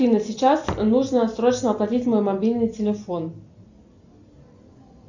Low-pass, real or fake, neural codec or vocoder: 7.2 kHz; real; none